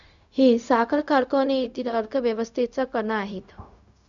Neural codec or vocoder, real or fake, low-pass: codec, 16 kHz, 0.4 kbps, LongCat-Audio-Codec; fake; 7.2 kHz